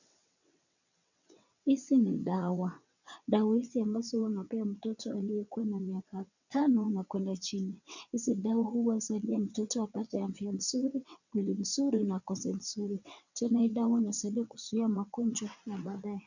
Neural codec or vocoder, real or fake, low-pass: vocoder, 22.05 kHz, 80 mel bands, WaveNeXt; fake; 7.2 kHz